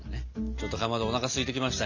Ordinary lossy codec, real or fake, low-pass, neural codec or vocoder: none; real; 7.2 kHz; none